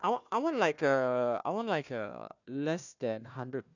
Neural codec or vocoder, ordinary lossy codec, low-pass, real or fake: codec, 16 kHz, 1 kbps, FunCodec, trained on Chinese and English, 50 frames a second; none; 7.2 kHz; fake